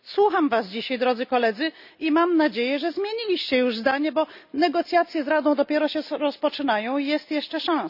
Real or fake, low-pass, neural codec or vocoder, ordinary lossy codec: real; 5.4 kHz; none; none